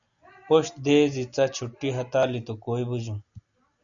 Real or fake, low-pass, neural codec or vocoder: real; 7.2 kHz; none